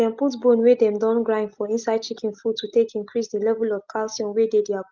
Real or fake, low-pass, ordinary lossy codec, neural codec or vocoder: real; 7.2 kHz; Opus, 24 kbps; none